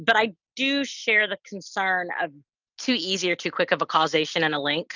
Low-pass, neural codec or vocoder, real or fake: 7.2 kHz; none; real